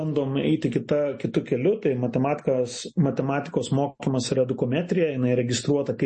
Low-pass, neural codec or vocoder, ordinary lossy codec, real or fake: 10.8 kHz; none; MP3, 32 kbps; real